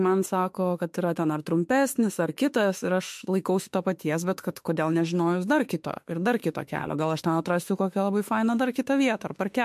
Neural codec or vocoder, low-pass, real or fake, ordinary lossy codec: autoencoder, 48 kHz, 32 numbers a frame, DAC-VAE, trained on Japanese speech; 14.4 kHz; fake; MP3, 64 kbps